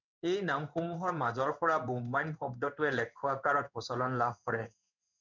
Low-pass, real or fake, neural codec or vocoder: 7.2 kHz; fake; codec, 16 kHz in and 24 kHz out, 1 kbps, XY-Tokenizer